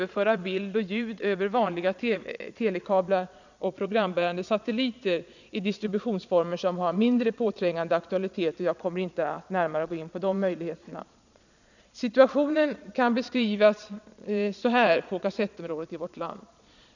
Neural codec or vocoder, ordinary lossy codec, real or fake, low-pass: vocoder, 22.05 kHz, 80 mel bands, Vocos; none; fake; 7.2 kHz